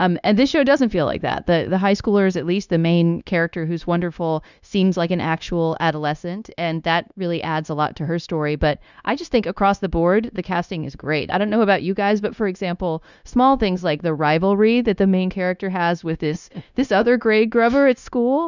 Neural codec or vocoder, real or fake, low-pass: codec, 16 kHz, 0.9 kbps, LongCat-Audio-Codec; fake; 7.2 kHz